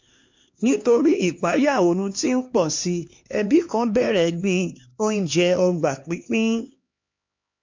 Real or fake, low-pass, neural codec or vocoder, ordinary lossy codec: fake; 7.2 kHz; codec, 16 kHz, 2 kbps, X-Codec, HuBERT features, trained on LibriSpeech; MP3, 48 kbps